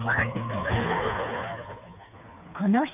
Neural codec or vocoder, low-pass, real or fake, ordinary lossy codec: codec, 16 kHz, 4 kbps, FreqCodec, smaller model; 3.6 kHz; fake; none